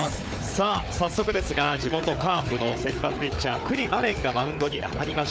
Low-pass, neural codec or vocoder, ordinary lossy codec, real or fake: none; codec, 16 kHz, 4 kbps, FunCodec, trained on Chinese and English, 50 frames a second; none; fake